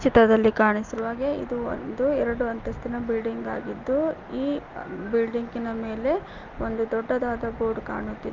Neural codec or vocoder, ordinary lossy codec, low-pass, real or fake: none; Opus, 32 kbps; 7.2 kHz; real